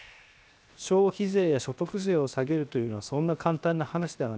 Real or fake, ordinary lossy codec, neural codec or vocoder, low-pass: fake; none; codec, 16 kHz, 0.7 kbps, FocalCodec; none